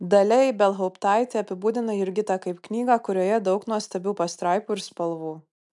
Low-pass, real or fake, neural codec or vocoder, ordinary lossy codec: 10.8 kHz; real; none; MP3, 96 kbps